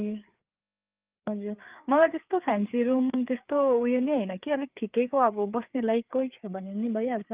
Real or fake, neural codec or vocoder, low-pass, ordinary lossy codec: fake; codec, 16 kHz, 4 kbps, FreqCodec, larger model; 3.6 kHz; Opus, 24 kbps